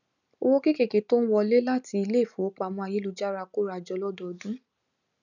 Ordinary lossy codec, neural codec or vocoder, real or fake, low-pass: none; none; real; 7.2 kHz